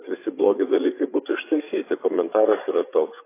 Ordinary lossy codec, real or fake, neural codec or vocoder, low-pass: AAC, 24 kbps; fake; codec, 16 kHz, 8 kbps, FreqCodec, larger model; 3.6 kHz